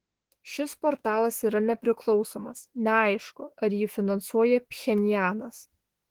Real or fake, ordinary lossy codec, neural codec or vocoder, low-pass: fake; Opus, 16 kbps; autoencoder, 48 kHz, 32 numbers a frame, DAC-VAE, trained on Japanese speech; 19.8 kHz